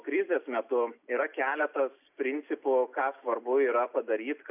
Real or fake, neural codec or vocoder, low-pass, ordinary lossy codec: real; none; 3.6 kHz; AAC, 32 kbps